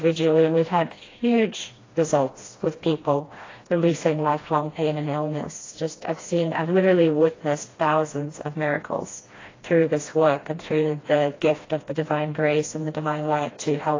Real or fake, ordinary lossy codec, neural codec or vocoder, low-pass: fake; AAC, 32 kbps; codec, 16 kHz, 1 kbps, FreqCodec, smaller model; 7.2 kHz